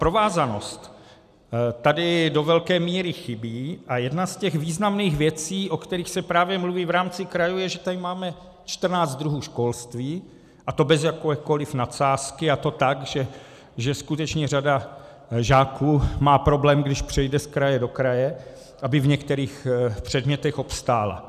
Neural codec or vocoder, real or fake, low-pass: none; real; 14.4 kHz